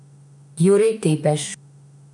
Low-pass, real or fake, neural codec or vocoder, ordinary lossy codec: 10.8 kHz; fake; autoencoder, 48 kHz, 32 numbers a frame, DAC-VAE, trained on Japanese speech; none